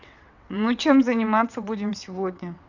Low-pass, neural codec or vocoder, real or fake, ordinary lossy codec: 7.2 kHz; vocoder, 22.05 kHz, 80 mel bands, WaveNeXt; fake; none